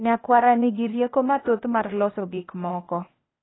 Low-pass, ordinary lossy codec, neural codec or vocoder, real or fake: 7.2 kHz; AAC, 16 kbps; codec, 16 kHz, 0.8 kbps, ZipCodec; fake